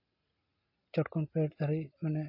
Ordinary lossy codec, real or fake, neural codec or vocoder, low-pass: MP3, 32 kbps; real; none; 5.4 kHz